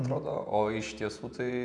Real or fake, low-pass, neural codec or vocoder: fake; 10.8 kHz; vocoder, 48 kHz, 128 mel bands, Vocos